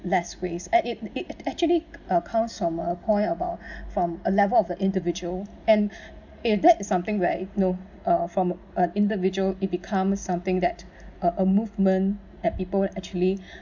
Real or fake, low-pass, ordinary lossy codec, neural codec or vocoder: real; 7.2 kHz; none; none